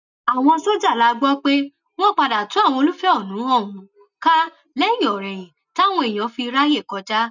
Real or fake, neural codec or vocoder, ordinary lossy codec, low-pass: real; none; none; 7.2 kHz